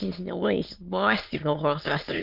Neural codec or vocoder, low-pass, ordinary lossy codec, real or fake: autoencoder, 22.05 kHz, a latent of 192 numbers a frame, VITS, trained on many speakers; 5.4 kHz; Opus, 24 kbps; fake